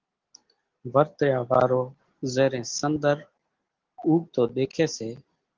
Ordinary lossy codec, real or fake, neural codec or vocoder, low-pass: Opus, 16 kbps; real; none; 7.2 kHz